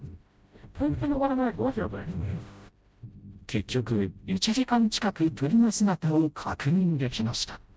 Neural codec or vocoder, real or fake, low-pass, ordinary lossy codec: codec, 16 kHz, 0.5 kbps, FreqCodec, smaller model; fake; none; none